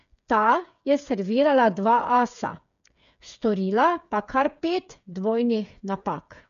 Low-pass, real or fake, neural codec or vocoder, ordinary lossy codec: 7.2 kHz; fake; codec, 16 kHz, 8 kbps, FreqCodec, smaller model; none